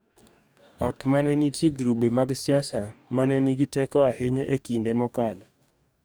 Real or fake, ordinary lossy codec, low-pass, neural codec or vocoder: fake; none; none; codec, 44.1 kHz, 2.6 kbps, DAC